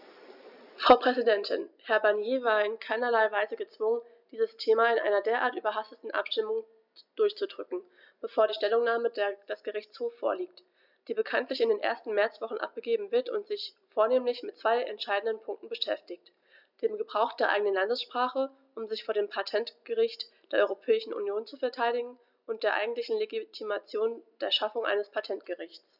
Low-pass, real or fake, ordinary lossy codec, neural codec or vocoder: 5.4 kHz; real; none; none